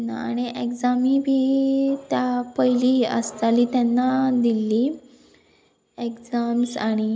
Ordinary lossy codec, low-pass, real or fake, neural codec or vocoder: none; none; real; none